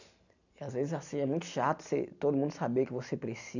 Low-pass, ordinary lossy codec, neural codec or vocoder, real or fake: 7.2 kHz; none; none; real